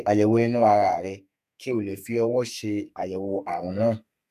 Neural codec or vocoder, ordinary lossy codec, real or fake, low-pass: codec, 32 kHz, 1.9 kbps, SNAC; none; fake; 14.4 kHz